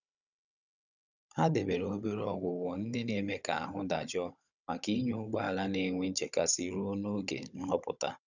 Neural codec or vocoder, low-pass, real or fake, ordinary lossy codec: codec, 16 kHz, 16 kbps, FunCodec, trained on Chinese and English, 50 frames a second; 7.2 kHz; fake; none